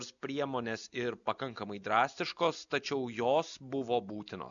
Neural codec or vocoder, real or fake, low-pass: none; real; 7.2 kHz